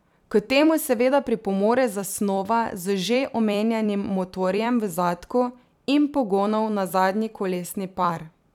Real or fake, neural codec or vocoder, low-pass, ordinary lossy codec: fake; vocoder, 44.1 kHz, 128 mel bands every 256 samples, BigVGAN v2; 19.8 kHz; none